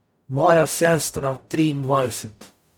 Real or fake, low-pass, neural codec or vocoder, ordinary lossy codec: fake; none; codec, 44.1 kHz, 0.9 kbps, DAC; none